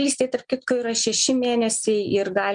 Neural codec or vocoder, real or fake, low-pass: none; real; 9.9 kHz